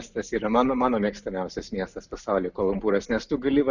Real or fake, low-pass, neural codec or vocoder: real; 7.2 kHz; none